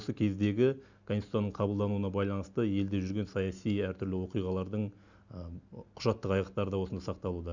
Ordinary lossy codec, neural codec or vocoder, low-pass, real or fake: none; none; 7.2 kHz; real